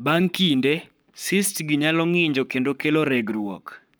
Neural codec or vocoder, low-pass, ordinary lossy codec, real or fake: codec, 44.1 kHz, 7.8 kbps, Pupu-Codec; none; none; fake